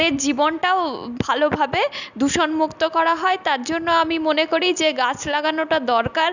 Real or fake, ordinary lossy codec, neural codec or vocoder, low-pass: real; none; none; 7.2 kHz